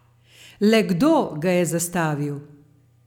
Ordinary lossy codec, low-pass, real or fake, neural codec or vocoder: none; 19.8 kHz; real; none